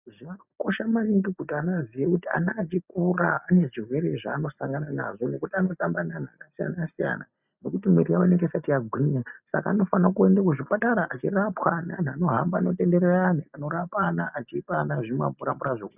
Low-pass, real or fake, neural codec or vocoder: 3.6 kHz; real; none